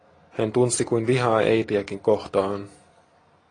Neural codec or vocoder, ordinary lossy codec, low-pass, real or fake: none; AAC, 32 kbps; 9.9 kHz; real